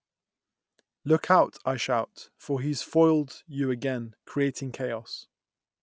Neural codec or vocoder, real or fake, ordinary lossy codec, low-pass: none; real; none; none